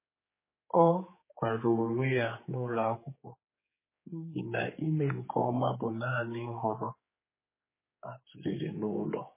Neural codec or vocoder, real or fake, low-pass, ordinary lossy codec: codec, 16 kHz, 4 kbps, X-Codec, HuBERT features, trained on general audio; fake; 3.6 kHz; MP3, 16 kbps